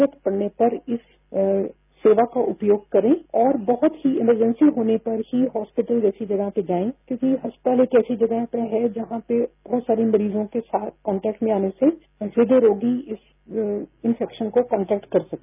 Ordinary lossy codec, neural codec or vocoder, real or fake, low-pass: none; none; real; 3.6 kHz